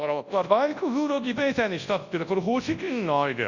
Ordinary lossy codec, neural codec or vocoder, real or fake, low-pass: Opus, 64 kbps; codec, 24 kHz, 0.9 kbps, WavTokenizer, large speech release; fake; 7.2 kHz